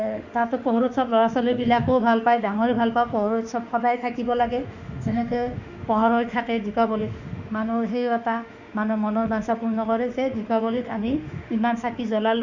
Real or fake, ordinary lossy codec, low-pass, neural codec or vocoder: fake; none; 7.2 kHz; autoencoder, 48 kHz, 32 numbers a frame, DAC-VAE, trained on Japanese speech